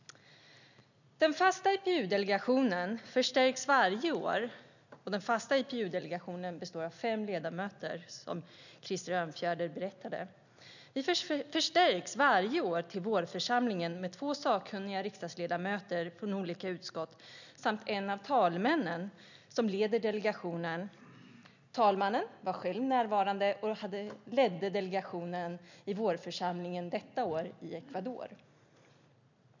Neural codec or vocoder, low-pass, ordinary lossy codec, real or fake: none; 7.2 kHz; none; real